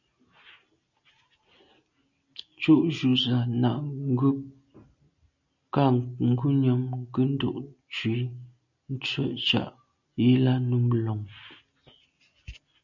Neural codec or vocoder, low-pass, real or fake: none; 7.2 kHz; real